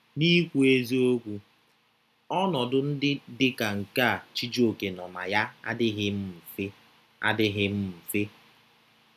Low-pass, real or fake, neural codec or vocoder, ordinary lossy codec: 14.4 kHz; real; none; none